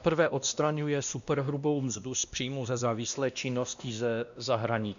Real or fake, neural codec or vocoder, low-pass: fake; codec, 16 kHz, 1 kbps, X-Codec, WavLM features, trained on Multilingual LibriSpeech; 7.2 kHz